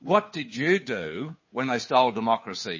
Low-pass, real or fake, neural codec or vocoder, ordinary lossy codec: 7.2 kHz; fake; codec, 16 kHz, 2 kbps, FunCodec, trained on Chinese and English, 25 frames a second; MP3, 32 kbps